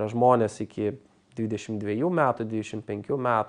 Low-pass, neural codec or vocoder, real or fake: 9.9 kHz; none; real